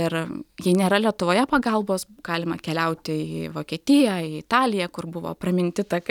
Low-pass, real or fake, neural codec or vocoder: 19.8 kHz; real; none